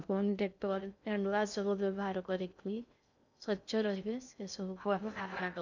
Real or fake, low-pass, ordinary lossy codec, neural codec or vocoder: fake; 7.2 kHz; none; codec, 16 kHz in and 24 kHz out, 0.6 kbps, FocalCodec, streaming, 2048 codes